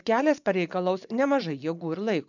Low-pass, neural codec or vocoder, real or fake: 7.2 kHz; vocoder, 24 kHz, 100 mel bands, Vocos; fake